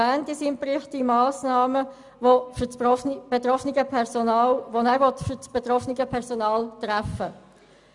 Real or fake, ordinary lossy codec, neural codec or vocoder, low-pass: real; none; none; 10.8 kHz